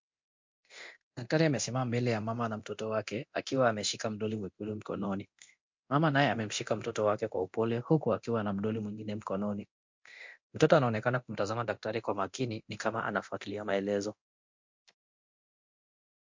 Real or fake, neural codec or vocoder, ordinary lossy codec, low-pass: fake; codec, 24 kHz, 0.9 kbps, DualCodec; MP3, 48 kbps; 7.2 kHz